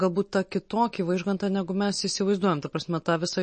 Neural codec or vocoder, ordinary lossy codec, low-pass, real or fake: none; MP3, 32 kbps; 10.8 kHz; real